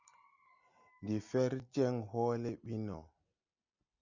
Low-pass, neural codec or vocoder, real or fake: 7.2 kHz; none; real